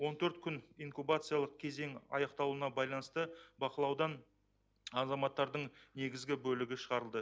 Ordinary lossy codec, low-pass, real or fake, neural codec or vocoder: none; none; real; none